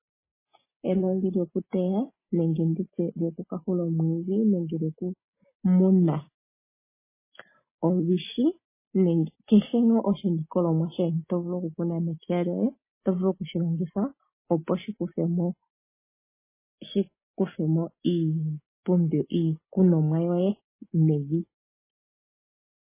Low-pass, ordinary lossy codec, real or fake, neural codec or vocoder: 3.6 kHz; MP3, 16 kbps; real; none